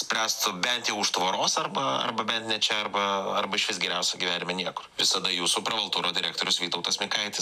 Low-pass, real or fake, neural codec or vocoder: 14.4 kHz; real; none